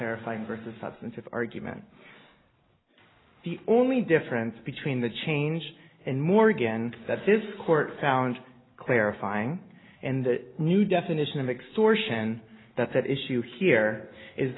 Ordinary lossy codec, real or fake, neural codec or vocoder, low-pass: AAC, 16 kbps; real; none; 7.2 kHz